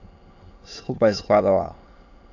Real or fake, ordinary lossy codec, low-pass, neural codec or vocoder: fake; AAC, 48 kbps; 7.2 kHz; autoencoder, 22.05 kHz, a latent of 192 numbers a frame, VITS, trained on many speakers